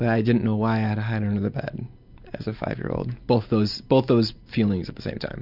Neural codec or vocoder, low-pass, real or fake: none; 5.4 kHz; real